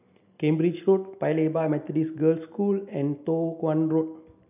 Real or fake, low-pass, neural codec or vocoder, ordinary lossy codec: real; 3.6 kHz; none; none